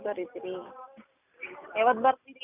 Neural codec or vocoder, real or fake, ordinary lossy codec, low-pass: none; real; none; 3.6 kHz